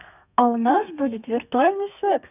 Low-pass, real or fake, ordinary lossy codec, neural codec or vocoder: 3.6 kHz; fake; none; codec, 32 kHz, 1.9 kbps, SNAC